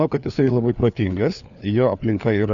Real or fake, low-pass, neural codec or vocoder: fake; 7.2 kHz; codec, 16 kHz, 4 kbps, FunCodec, trained on LibriTTS, 50 frames a second